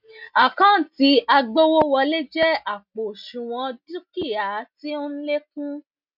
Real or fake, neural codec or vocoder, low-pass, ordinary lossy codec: real; none; 5.4 kHz; none